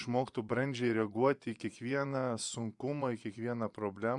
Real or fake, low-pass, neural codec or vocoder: fake; 10.8 kHz; vocoder, 24 kHz, 100 mel bands, Vocos